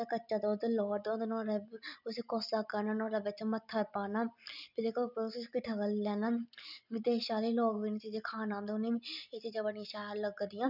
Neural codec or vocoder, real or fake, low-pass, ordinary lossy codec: none; real; 5.4 kHz; none